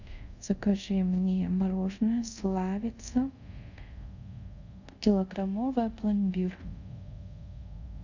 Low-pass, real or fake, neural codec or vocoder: 7.2 kHz; fake; codec, 24 kHz, 0.5 kbps, DualCodec